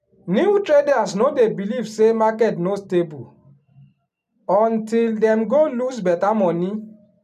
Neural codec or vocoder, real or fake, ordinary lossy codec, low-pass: none; real; none; 14.4 kHz